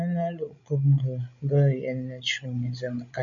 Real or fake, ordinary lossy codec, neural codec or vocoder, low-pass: fake; MP3, 64 kbps; codec, 16 kHz, 16 kbps, FreqCodec, larger model; 7.2 kHz